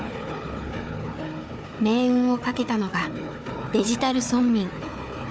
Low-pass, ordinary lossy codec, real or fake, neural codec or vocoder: none; none; fake; codec, 16 kHz, 4 kbps, FunCodec, trained on Chinese and English, 50 frames a second